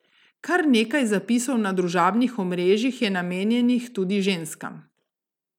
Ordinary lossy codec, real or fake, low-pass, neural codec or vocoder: none; real; 19.8 kHz; none